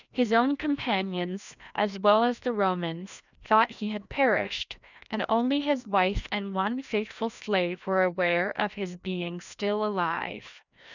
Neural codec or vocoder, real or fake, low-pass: codec, 16 kHz, 1 kbps, FreqCodec, larger model; fake; 7.2 kHz